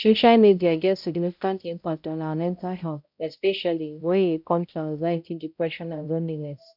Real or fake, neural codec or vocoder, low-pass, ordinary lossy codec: fake; codec, 16 kHz, 0.5 kbps, X-Codec, HuBERT features, trained on balanced general audio; 5.4 kHz; MP3, 48 kbps